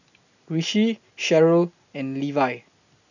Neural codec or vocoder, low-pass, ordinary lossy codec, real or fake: none; 7.2 kHz; none; real